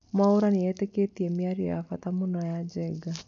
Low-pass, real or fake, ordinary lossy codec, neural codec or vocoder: 7.2 kHz; real; none; none